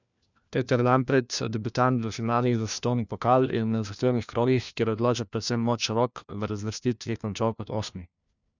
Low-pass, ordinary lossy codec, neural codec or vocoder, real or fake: 7.2 kHz; none; codec, 16 kHz, 1 kbps, FunCodec, trained on LibriTTS, 50 frames a second; fake